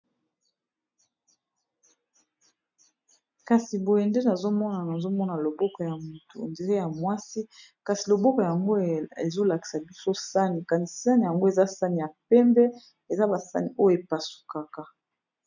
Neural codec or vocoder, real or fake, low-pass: none; real; 7.2 kHz